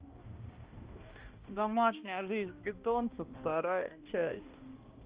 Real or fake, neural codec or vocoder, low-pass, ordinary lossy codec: fake; codec, 16 kHz, 1 kbps, X-Codec, HuBERT features, trained on balanced general audio; 3.6 kHz; Opus, 32 kbps